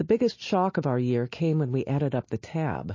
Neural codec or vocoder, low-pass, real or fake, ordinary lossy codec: none; 7.2 kHz; real; MP3, 32 kbps